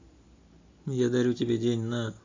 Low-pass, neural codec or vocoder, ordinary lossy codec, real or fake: 7.2 kHz; none; AAC, 48 kbps; real